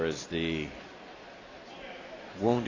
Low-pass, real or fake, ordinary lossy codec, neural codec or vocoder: 7.2 kHz; real; AAC, 32 kbps; none